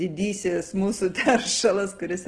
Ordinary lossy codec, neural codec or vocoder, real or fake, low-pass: Opus, 16 kbps; none; real; 9.9 kHz